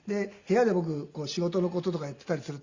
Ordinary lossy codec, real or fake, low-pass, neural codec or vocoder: Opus, 64 kbps; real; 7.2 kHz; none